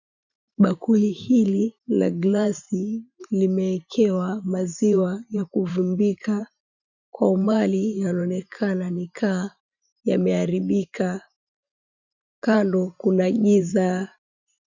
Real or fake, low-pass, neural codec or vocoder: fake; 7.2 kHz; vocoder, 44.1 kHz, 128 mel bands every 512 samples, BigVGAN v2